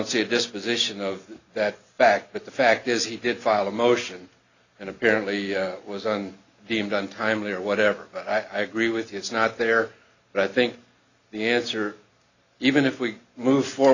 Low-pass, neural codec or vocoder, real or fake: 7.2 kHz; none; real